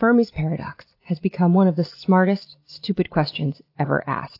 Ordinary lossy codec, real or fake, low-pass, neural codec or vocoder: AAC, 32 kbps; real; 5.4 kHz; none